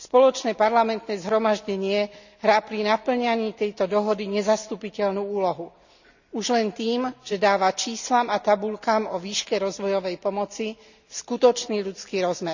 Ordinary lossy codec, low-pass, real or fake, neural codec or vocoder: none; 7.2 kHz; real; none